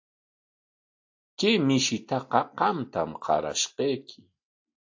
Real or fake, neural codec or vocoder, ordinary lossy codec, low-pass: real; none; AAC, 48 kbps; 7.2 kHz